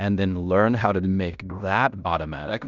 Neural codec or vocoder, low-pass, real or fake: codec, 16 kHz in and 24 kHz out, 0.9 kbps, LongCat-Audio-Codec, fine tuned four codebook decoder; 7.2 kHz; fake